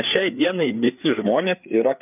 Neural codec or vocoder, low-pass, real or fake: codec, 16 kHz, 4 kbps, FreqCodec, larger model; 3.6 kHz; fake